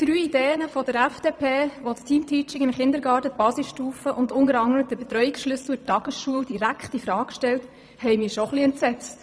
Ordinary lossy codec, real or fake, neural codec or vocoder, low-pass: none; fake; vocoder, 22.05 kHz, 80 mel bands, Vocos; none